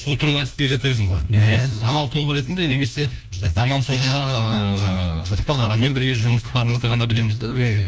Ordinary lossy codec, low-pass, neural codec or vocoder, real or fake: none; none; codec, 16 kHz, 1 kbps, FunCodec, trained on LibriTTS, 50 frames a second; fake